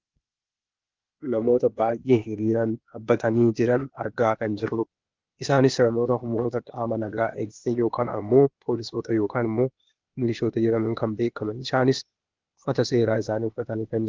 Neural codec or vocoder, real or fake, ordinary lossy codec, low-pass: codec, 16 kHz, 0.8 kbps, ZipCodec; fake; Opus, 32 kbps; 7.2 kHz